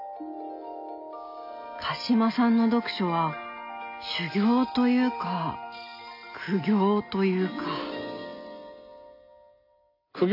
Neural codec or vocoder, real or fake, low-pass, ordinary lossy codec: none; real; 5.4 kHz; AAC, 32 kbps